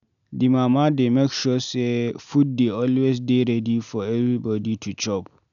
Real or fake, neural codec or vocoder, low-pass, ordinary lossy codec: real; none; 7.2 kHz; none